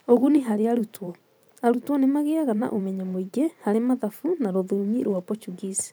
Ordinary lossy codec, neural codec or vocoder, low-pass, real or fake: none; vocoder, 44.1 kHz, 128 mel bands every 512 samples, BigVGAN v2; none; fake